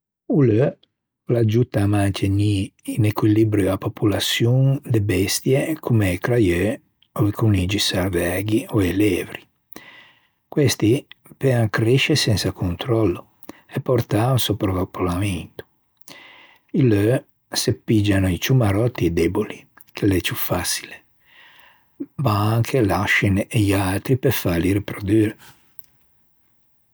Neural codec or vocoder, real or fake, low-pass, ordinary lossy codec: none; real; none; none